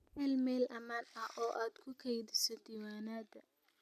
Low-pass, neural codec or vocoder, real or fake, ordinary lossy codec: 14.4 kHz; none; real; none